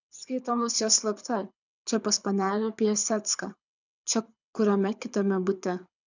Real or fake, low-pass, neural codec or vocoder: fake; 7.2 kHz; codec, 24 kHz, 6 kbps, HILCodec